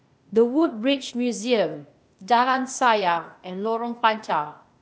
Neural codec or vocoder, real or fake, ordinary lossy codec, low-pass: codec, 16 kHz, 0.8 kbps, ZipCodec; fake; none; none